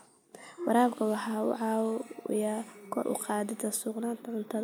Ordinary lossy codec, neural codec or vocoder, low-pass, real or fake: none; none; none; real